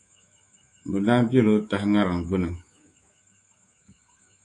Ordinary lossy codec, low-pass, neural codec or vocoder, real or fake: AAC, 48 kbps; 10.8 kHz; codec, 24 kHz, 3.1 kbps, DualCodec; fake